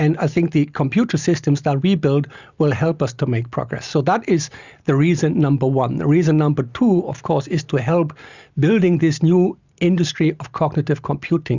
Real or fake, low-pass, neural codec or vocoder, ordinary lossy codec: real; 7.2 kHz; none; Opus, 64 kbps